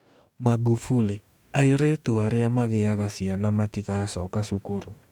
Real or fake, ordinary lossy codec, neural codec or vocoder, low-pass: fake; none; codec, 44.1 kHz, 2.6 kbps, DAC; 19.8 kHz